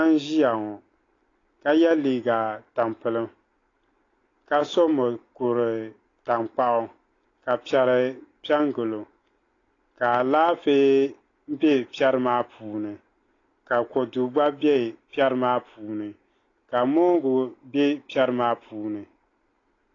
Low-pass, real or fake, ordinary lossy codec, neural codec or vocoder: 7.2 kHz; real; AAC, 32 kbps; none